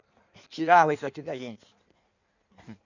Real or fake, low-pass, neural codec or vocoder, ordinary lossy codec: fake; 7.2 kHz; codec, 16 kHz in and 24 kHz out, 1.1 kbps, FireRedTTS-2 codec; none